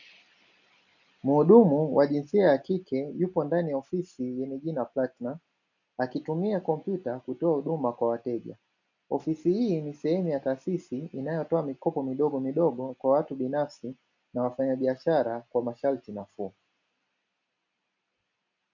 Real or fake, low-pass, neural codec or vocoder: real; 7.2 kHz; none